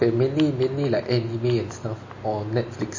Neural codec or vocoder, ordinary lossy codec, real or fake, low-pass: none; MP3, 32 kbps; real; 7.2 kHz